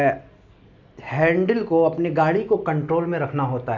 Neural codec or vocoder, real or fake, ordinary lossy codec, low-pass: none; real; none; 7.2 kHz